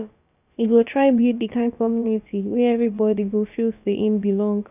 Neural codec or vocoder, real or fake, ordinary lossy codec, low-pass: codec, 16 kHz, about 1 kbps, DyCAST, with the encoder's durations; fake; none; 3.6 kHz